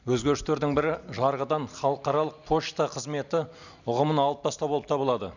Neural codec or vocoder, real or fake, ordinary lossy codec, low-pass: none; real; none; 7.2 kHz